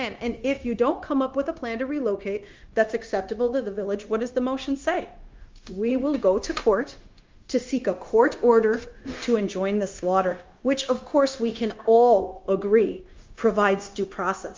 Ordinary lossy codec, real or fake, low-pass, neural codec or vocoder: Opus, 32 kbps; fake; 7.2 kHz; codec, 16 kHz, 0.9 kbps, LongCat-Audio-Codec